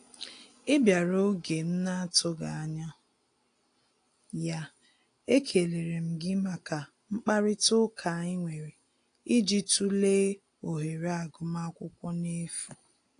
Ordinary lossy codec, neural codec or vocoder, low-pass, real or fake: AAC, 48 kbps; none; 9.9 kHz; real